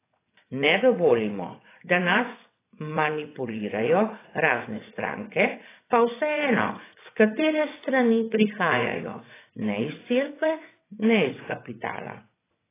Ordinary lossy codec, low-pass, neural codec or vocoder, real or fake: AAC, 16 kbps; 3.6 kHz; vocoder, 22.05 kHz, 80 mel bands, WaveNeXt; fake